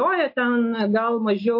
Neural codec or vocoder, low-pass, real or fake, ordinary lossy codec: none; 5.4 kHz; real; MP3, 32 kbps